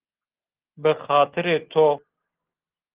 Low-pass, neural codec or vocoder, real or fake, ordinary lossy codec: 3.6 kHz; none; real; Opus, 16 kbps